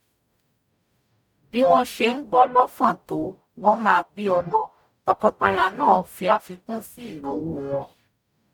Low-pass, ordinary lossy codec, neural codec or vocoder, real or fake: 19.8 kHz; none; codec, 44.1 kHz, 0.9 kbps, DAC; fake